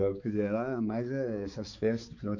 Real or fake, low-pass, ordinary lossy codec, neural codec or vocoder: fake; 7.2 kHz; none; codec, 16 kHz, 4 kbps, X-Codec, HuBERT features, trained on balanced general audio